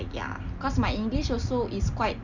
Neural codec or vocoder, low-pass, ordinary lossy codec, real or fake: none; 7.2 kHz; none; real